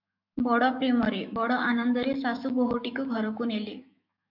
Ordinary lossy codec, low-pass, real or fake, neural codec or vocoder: MP3, 48 kbps; 5.4 kHz; fake; autoencoder, 48 kHz, 128 numbers a frame, DAC-VAE, trained on Japanese speech